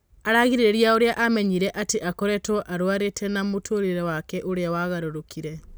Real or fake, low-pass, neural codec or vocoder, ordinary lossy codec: real; none; none; none